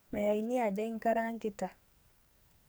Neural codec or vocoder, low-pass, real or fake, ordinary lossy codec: codec, 44.1 kHz, 2.6 kbps, SNAC; none; fake; none